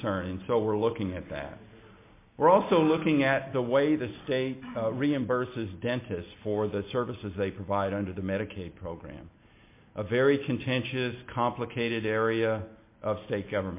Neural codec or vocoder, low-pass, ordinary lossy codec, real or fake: none; 3.6 kHz; MP3, 24 kbps; real